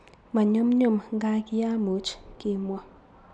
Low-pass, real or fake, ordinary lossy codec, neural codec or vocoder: none; real; none; none